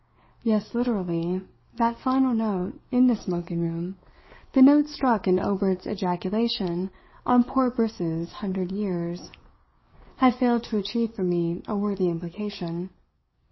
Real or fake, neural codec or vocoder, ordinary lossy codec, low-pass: fake; codec, 44.1 kHz, 7.8 kbps, DAC; MP3, 24 kbps; 7.2 kHz